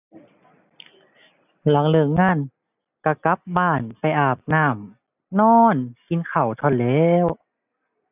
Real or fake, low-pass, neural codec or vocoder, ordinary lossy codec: real; 3.6 kHz; none; none